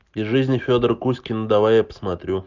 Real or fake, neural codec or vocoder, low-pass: real; none; 7.2 kHz